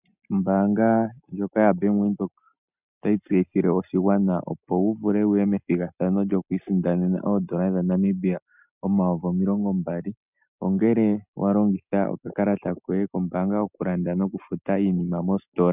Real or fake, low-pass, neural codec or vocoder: real; 3.6 kHz; none